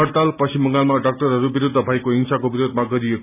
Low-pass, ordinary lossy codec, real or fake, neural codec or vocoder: 3.6 kHz; none; real; none